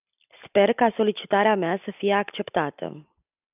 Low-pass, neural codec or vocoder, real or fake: 3.6 kHz; none; real